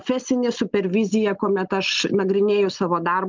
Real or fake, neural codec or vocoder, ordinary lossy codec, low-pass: real; none; Opus, 32 kbps; 7.2 kHz